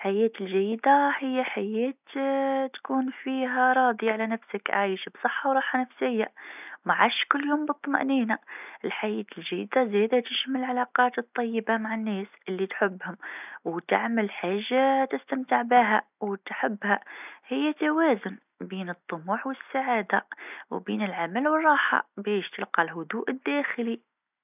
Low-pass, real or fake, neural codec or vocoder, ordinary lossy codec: 3.6 kHz; real; none; none